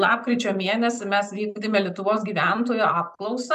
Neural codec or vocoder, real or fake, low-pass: none; real; 14.4 kHz